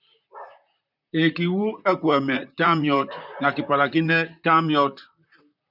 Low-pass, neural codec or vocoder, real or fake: 5.4 kHz; vocoder, 44.1 kHz, 128 mel bands, Pupu-Vocoder; fake